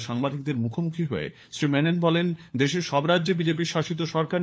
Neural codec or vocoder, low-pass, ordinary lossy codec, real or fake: codec, 16 kHz, 4 kbps, FunCodec, trained on LibriTTS, 50 frames a second; none; none; fake